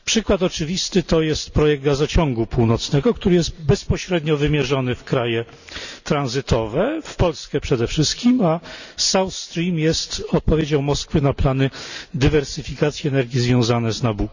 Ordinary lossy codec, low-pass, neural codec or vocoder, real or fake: none; 7.2 kHz; none; real